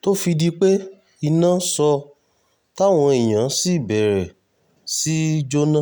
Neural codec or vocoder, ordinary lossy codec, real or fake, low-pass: none; none; real; none